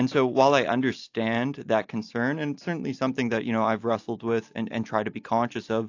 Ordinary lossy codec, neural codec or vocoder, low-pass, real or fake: AAC, 48 kbps; none; 7.2 kHz; real